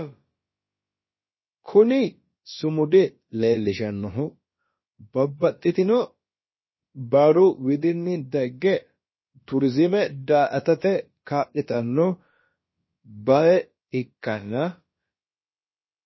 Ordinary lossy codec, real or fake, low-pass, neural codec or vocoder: MP3, 24 kbps; fake; 7.2 kHz; codec, 16 kHz, about 1 kbps, DyCAST, with the encoder's durations